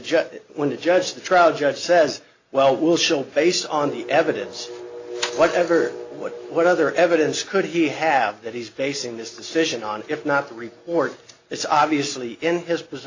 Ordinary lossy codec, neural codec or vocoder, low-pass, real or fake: AAC, 48 kbps; none; 7.2 kHz; real